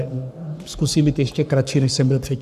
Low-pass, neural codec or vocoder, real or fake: 14.4 kHz; codec, 44.1 kHz, 7.8 kbps, Pupu-Codec; fake